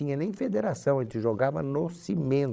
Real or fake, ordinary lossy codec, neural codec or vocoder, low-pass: fake; none; codec, 16 kHz, 16 kbps, FreqCodec, larger model; none